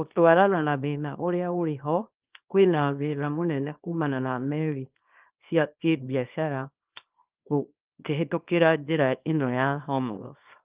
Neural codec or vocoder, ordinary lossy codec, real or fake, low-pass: codec, 24 kHz, 0.9 kbps, WavTokenizer, small release; Opus, 32 kbps; fake; 3.6 kHz